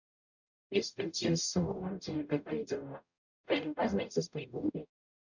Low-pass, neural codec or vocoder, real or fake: 7.2 kHz; codec, 44.1 kHz, 0.9 kbps, DAC; fake